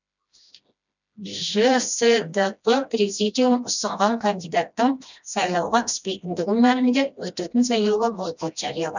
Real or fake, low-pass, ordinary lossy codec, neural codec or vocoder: fake; 7.2 kHz; none; codec, 16 kHz, 1 kbps, FreqCodec, smaller model